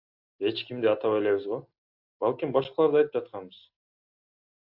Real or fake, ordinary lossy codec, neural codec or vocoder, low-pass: real; AAC, 48 kbps; none; 5.4 kHz